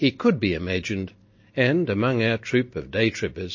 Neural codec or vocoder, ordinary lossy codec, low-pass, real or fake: none; MP3, 32 kbps; 7.2 kHz; real